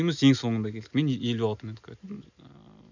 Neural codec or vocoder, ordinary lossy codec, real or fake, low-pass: none; none; real; 7.2 kHz